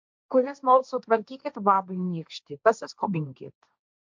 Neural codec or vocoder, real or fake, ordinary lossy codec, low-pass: codec, 16 kHz, 1.1 kbps, Voila-Tokenizer; fake; MP3, 64 kbps; 7.2 kHz